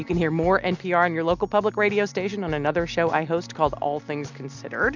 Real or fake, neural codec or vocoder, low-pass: real; none; 7.2 kHz